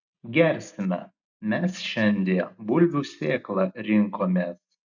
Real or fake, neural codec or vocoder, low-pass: real; none; 7.2 kHz